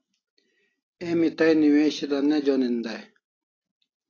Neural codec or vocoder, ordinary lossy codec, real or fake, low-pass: none; AAC, 32 kbps; real; 7.2 kHz